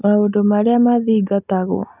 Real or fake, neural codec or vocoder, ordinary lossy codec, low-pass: real; none; none; 3.6 kHz